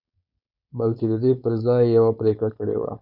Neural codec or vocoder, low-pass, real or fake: codec, 16 kHz, 4.8 kbps, FACodec; 5.4 kHz; fake